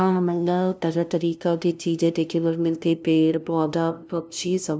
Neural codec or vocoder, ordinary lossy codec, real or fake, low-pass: codec, 16 kHz, 0.5 kbps, FunCodec, trained on LibriTTS, 25 frames a second; none; fake; none